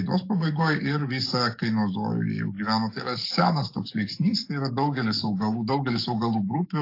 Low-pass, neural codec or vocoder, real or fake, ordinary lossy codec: 5.4 kHz; codec, 44.1 kHz, 7.8 kbps, DAC; fake; AAC, 32 kbps